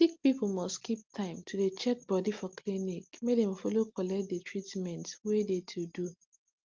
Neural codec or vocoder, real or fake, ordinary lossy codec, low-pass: none; real; Opus, 32 kbps; 7.2 kHz